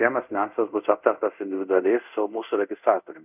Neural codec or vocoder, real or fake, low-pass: codec, 24 kHz, 0.5 kbps, DualCodec; fake; 3.6 kHz